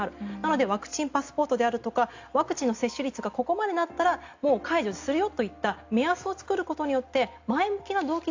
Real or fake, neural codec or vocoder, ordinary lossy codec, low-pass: real; none; AAC, 48 kbps; 7.2 kHz